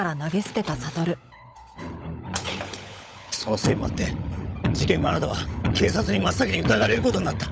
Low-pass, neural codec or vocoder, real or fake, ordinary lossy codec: none; codec, 16 kHz, 16 kbps, FunCodec, trained on LibriTTS, 50 frames a second; fake; none